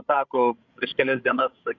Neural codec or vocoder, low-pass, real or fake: codec, 16 kHz, 16 kbps, FreqCodec, larger model; 7.2 kHz; fake